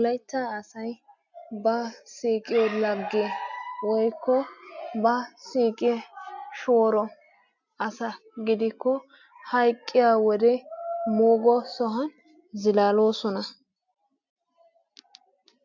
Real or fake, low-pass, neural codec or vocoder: real; 7.2 kHz; none